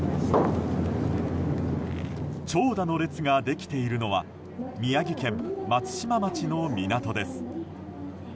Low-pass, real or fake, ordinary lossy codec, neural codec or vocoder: none; real; none; none